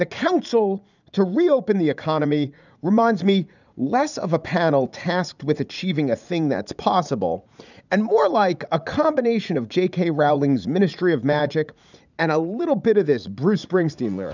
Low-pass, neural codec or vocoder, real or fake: 7.2 kHz; vocoder, 44.1 kHz, 80 mel bands, Vocos; fake